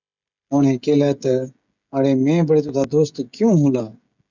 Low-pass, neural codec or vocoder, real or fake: 7.2 kHz; codec, 16 kHz, 16 kbps, FreqCodec, smaller model; fake